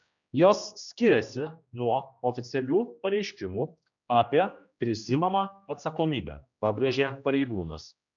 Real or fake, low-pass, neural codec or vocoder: fake; 7.2 kHz; codec, 16 kHz, 1 kbps, X-Codec, HuBERT features, trained on general audio